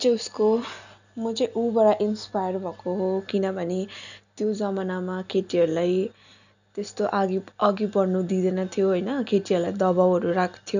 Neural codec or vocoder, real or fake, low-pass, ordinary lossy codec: none; real; 7.2 kHz; none